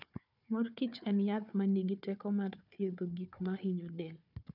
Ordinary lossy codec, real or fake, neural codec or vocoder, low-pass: none; fake; codec, 16 kHz, 4 kbps, FunCodec, trained on Chinese and English, 50 frames a second; 5.4 kHz